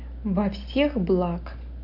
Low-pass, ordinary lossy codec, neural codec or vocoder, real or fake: 5.4 kHz; none; none; real